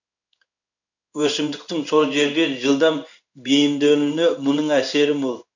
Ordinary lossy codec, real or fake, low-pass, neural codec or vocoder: none; fake; 7.2 kHz; codec, 16 kHz in and 24 kHz out, 1 kbps, XY-Tokenizer